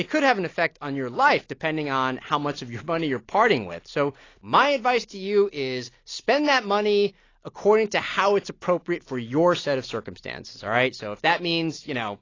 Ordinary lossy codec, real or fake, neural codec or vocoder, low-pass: AAC, 32 kbps; real; none; 7.2 kHz